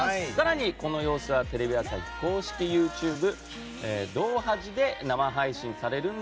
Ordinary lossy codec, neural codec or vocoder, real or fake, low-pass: none; none; real; none